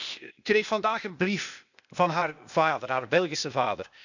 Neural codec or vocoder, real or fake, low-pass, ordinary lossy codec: codec, 16 kHz, 0.8 kbps, ZipCodec; fake; 7.2 kHz; none